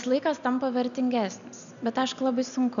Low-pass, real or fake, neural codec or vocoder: 7.2 kHz; real; none